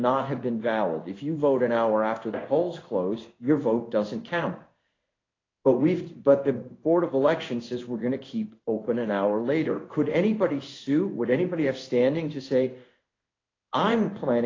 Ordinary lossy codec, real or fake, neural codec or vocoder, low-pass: AAC, 32 kbps; fake; codec, 16 kHz in and 24 kHz out, 1 kbps, XY-Tokenizer; 7.2 kHz